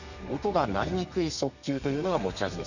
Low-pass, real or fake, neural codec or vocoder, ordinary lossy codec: 7.2 kHz; fake; codec, 32 kHz, 1.9 kbps, SNAC; none